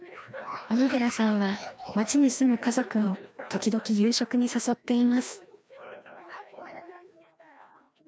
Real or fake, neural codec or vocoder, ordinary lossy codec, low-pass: fake; codec, 16 kHz, 1 kbps, FreqCodec, larger model; none; none